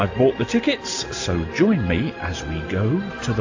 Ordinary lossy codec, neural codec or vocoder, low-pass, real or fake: AAC, 48 kbps; none; 7.2 kHz; real